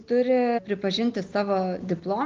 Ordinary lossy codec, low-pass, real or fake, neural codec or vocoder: Opus, 24 kbps; 7.2 kHz; real; none